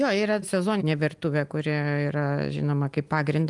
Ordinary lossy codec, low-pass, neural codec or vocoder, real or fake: Opus, 32 kbps; 10.8 kHz; none; real